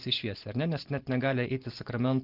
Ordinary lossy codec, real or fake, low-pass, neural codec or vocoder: Opus, 16 kbps; real; 5.4 kHz; none